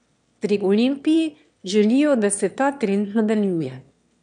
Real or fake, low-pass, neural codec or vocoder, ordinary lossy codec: fake; 9.9 kHz; autoencoder, 22.05 kHz, a latent of 192 numbers a frame, VITS, trained on one speaker; none